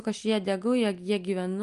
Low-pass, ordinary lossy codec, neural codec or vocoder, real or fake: 10.8 kHz; Opus, 32 kbps; none; real